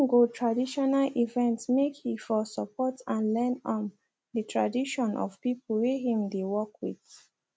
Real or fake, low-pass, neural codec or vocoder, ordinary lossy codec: real; none; none; none